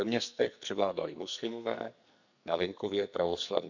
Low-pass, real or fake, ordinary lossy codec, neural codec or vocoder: 7.2 kHz; fake; none; codec, 44.1 kHz, 2.6 kbps, SNAC